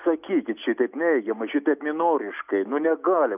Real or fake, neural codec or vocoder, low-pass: real; none; 3.6 kHz